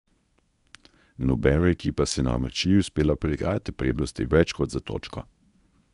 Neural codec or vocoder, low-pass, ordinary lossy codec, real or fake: codec, 24 kHz, 0.9 kbps, WavTokenizer, medium speech release version 1; 10.8 kHz; none; fake